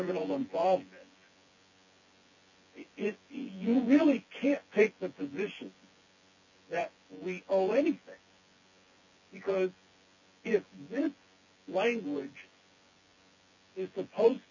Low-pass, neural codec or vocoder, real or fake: 7.2 kHz; vocoder, 24 kHz, 100 mel bands, Vocos; fake